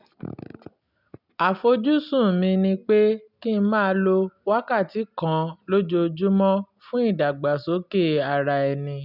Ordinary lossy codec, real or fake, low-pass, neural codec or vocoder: none; real; 5.4 kHz; none